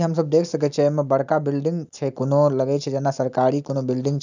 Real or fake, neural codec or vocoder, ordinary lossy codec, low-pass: real; none; none; 7.2 kHz